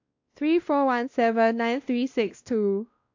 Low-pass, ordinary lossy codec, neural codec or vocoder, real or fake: 7.2 kHz; AAC, 48 kbps; codec, 16 kHz, 1 kbps, X-Codec, WavLM features, trained on Multilingual LibriSpeech; fake